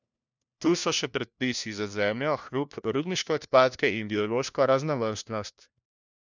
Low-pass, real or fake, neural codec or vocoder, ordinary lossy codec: 7.2 kHz; fake; codec, 16 kHz, 1 kbps, FunCodec, trained on LibriTTS, 50 frames a second; none